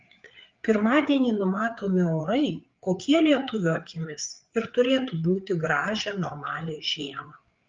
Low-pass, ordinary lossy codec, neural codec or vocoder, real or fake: 7.2 kHz; Opus, 32 kbps; codec, 16 kHz, 4 kbps, FreqCodec, larger model; fake